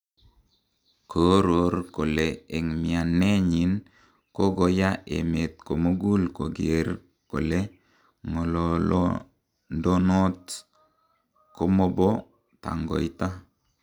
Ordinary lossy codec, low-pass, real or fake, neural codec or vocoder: none; 19.8 kHz; real; none